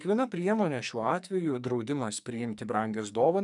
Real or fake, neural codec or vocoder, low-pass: fake; codec, 44.1 kHz, 2.6 kbps, SNAC; 10.8 kHz